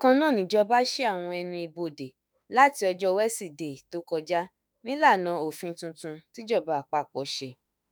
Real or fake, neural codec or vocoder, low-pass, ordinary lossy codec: fake; autoencoder, 48 kHz, 32 numbers a frame, DAC-VAE, trained on Japanese speech; none; none